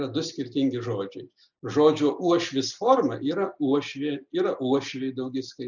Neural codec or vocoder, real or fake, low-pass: none; real; 7.2 kHz